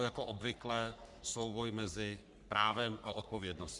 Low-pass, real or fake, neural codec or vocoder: 10.8 kHz; fake; codec, 44.1 kHz, 3.4 kbps, Pupu-Codec